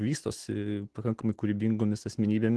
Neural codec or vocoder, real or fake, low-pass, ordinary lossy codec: none; real; 10.8 kHz; Opus, 16 kbps